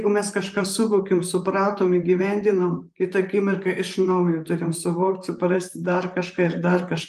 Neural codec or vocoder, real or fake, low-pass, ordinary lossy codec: vocoder, 44.1 kHz, 128 mel bands, Pupu-Vocoder; fake; 14.4 kHz; Opus, 32 kbps